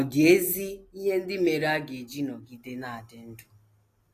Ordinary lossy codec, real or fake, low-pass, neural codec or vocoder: AAC, 48 kbps; real; 14.4 kHz; none